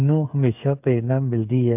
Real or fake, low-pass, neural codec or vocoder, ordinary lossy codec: fake; 3.6 kHz; codec, 16 kHz, 8 kbps, FreqCodec, smaller model; AAC, 32 kbps